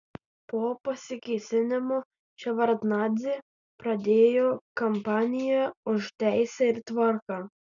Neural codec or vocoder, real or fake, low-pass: none; real; 7.2 kHz